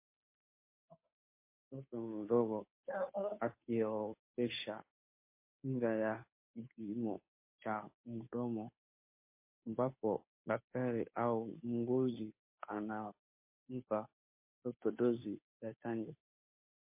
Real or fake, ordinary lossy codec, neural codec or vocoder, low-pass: fake; MP3, 24 kbps; codec, 16 kHz, 2 kbps, FunCodec, trained on Chinese and English, 25 frames a second; 3.6 kHz